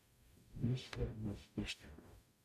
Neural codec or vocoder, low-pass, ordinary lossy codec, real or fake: codec, 44.1 kHz, 0.9 kbps, DAC; 14.4 kHz; none; fake